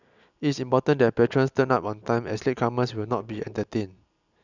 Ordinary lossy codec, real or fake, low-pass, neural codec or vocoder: none; real; 7.2 kHz; none